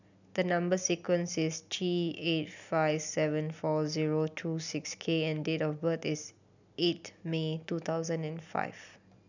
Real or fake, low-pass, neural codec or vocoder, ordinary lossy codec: real; 7.2 kHz; none; none